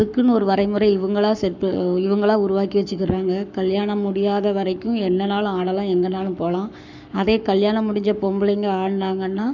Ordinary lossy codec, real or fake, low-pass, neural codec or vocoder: none; fake; 7.2 kHz; codec, 44.1 kHz, 7.8 kbps, Pupu-Codec